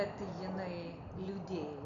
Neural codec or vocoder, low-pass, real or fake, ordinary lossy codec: none; 7.2 kHz; real; MP3, 96 kbps